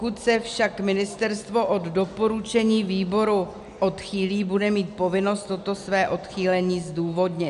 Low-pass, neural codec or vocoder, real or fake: 10.8 kHz; none; real